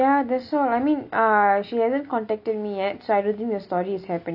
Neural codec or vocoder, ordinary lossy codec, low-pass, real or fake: none; none; 5.4 kHz; real